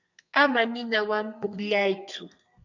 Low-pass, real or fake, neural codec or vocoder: 7.2 kHz; fake; codec, 32 kHz, 1.9 kbps, SNAC